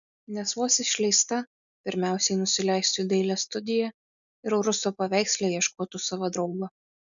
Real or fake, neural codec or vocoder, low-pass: real; none; 7.2 kHz